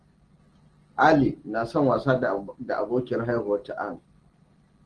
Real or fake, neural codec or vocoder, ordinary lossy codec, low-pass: real; none; Opus, 16 kbps; 9.9 kHz